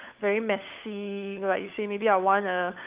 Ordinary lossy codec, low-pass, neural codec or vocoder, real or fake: Opus, 24 kbps; 3.6 kHz; codec, 16 kHz, 16 kbps, FunCodec, trained on LibriTTS, 50 frames a second; fake